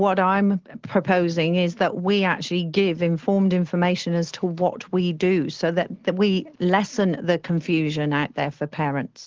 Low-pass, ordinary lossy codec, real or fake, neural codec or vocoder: 7.2 kHz; Opus, 16 kbps; real; none